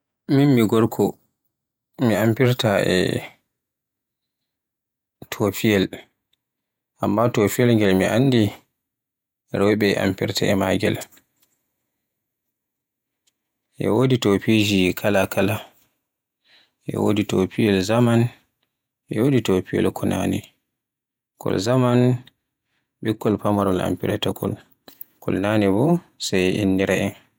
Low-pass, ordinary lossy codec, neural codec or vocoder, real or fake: 19.8 kHz; none; vocoder, 48 kHz, 128 mel bands, Vocos; fake